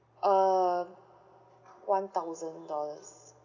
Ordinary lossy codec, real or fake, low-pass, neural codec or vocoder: none; real; 7.2 kHz; none